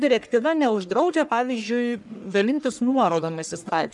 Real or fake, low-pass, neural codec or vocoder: fake; 10.8 kHz; codec, 44.1 kHz, 1.7 kbps, Pupu-Codec